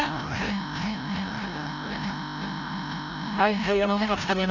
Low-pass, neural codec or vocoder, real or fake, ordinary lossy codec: 7.2 kHz; codec, 16 kHz, 0.5 kbps, FreqCodec, larger model; fake; none